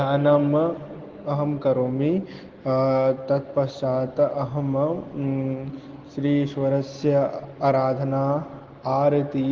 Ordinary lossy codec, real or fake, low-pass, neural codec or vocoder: Opus, 16 kbps; real; 7.2 kHz; none